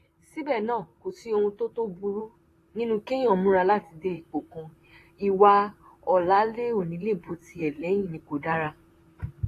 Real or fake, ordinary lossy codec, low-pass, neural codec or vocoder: fake; AAC, 48 kbps; 14.4 kHz; vocoder, 44.1 kHz, 128 mel bands, Pupu-Vocoder